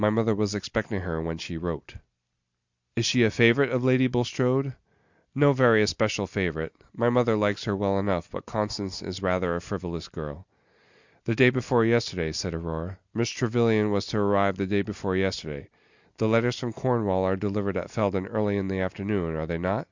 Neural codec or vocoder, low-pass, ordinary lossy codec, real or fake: none; 7.2 kHz; Opus, 64 kbps; real